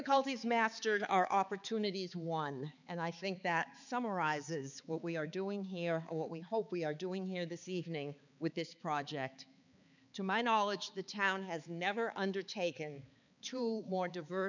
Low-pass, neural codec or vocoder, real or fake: 7.2 kHz; codec, 16 kHz, 4 kbps, X-Codec, HuBERT features, trained on balanced general audio; fake